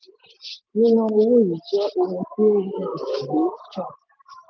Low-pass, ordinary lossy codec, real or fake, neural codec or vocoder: none; none; real; none